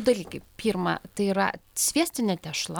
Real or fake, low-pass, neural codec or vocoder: real; 19.8 kHz; none